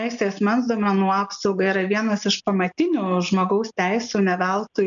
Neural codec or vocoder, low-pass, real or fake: none; 7.2 kHz; real